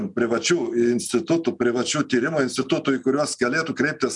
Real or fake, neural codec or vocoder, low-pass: real; none; 10.8 kHz